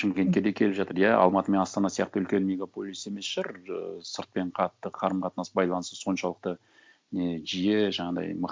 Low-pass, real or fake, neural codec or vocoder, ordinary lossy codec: none; real; none; none